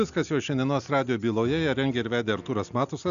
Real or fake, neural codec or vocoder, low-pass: real; none; 7.2 kHz